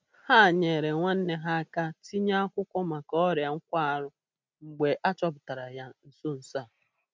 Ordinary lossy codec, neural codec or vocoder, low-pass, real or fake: none; none; none; real